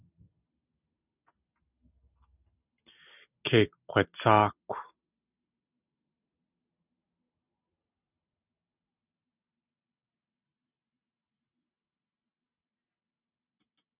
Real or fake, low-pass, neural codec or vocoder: real; 3.6 kHz; none